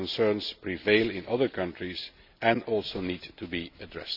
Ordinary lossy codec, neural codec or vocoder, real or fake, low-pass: none; none; real; 5.4 kHz